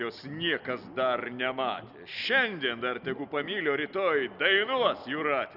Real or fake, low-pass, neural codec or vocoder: real; 5.4 kHz; none